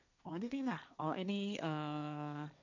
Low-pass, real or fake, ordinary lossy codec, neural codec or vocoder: 7.2 kHz; fake; none; codec, 16 kHz, 1.1 kbps, Voila-Tokenizer